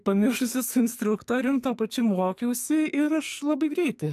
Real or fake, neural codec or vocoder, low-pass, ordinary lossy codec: fake; codec, 44.1 kHz, 2.6 kbps, SNAC; 14.4 kHz; AAC, 96 kbps